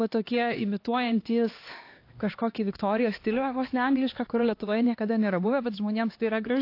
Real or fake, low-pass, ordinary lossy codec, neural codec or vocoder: fake; 5.4 kHz; AAC, 32 kbps; codec, 16 kHz, 2 kbps, X-Codec, HuBERT features, trained on LibriSpeech